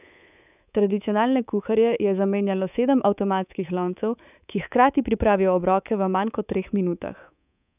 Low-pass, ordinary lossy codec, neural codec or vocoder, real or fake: 3.6 kHz; none; codec, 24 kHz, 3.1 kbps, DualCodec; fake